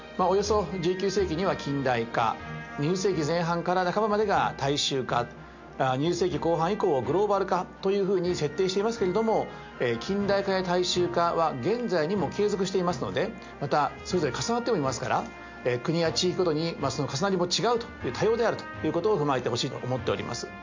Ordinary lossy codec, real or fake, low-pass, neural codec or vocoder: MP3, 48 kbps; real; 7.2 kHz; none